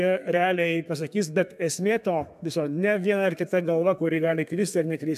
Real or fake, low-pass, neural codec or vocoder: fake; 14.4 kHz; codec, 32 kHz, 1.9 kbps, SNAC